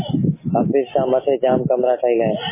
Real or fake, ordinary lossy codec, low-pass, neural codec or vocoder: real; MP3, 16 kbps; 3.6 kHz; none